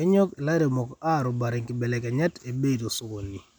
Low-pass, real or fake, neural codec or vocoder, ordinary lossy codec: 19.8 kHz; real; none; none